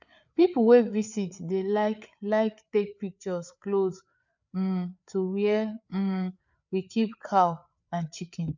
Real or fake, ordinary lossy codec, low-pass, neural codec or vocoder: fake; none; 7.2 kHz; codec, 16 kHz, 4 kbps, FreqCodec, larger model